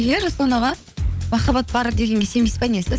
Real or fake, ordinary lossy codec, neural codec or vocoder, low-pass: fake; none; codec, 16 kHz, 16 kbps, FunCodec, trained on LibriTTS, 50 frames a second; none